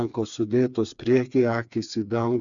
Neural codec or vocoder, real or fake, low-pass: codec, 16 kHz, 4 kbps, FreqCodec, smaller model; fake; 7.2 kHz